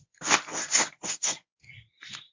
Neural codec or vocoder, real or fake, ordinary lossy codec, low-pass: codec, 24 kHz, 0.9 kbps, WavTokenizer, medium speech release version 1; fake; MP3, 64 kbps; 7.2 kHz